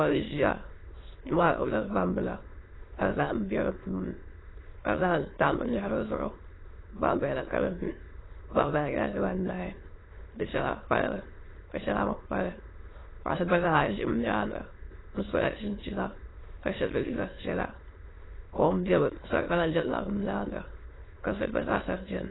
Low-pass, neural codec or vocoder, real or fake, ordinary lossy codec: 7.2 kHz; autoencoder, 22.05 kHz, a latent of 192 numbers a frame, VITS, trained on many speakers; fake; AAC, 16 kbps